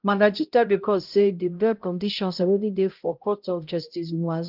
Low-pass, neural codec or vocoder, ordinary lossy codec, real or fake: 5.4 kHz; codec, 16 kHz, 0.5 kbps, X-Codec, HuBERT features, trained on balanced general audio; Opus, 32 kbps; fake